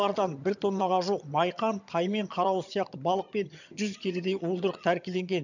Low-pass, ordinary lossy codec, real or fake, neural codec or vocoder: 7.2 kHz; none; fake; vocoder, 22.05 kHz, 80 mel bands, HiFi-GAN